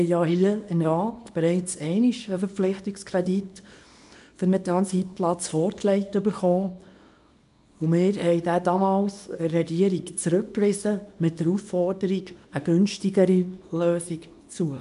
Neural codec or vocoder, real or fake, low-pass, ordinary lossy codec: codec, 24 kHz, 0.9 kbps, WavTokenizer, small release; fake; 10.8 kHz; MP3, 96 kbps